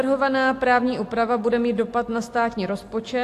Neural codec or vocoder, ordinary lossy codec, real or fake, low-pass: none; AAC, 64 kbps; real; 14.4 kHz